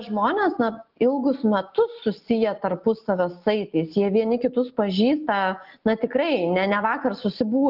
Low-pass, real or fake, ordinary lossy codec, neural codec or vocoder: 5.4 kHz; real; Opus, 24 kbps; none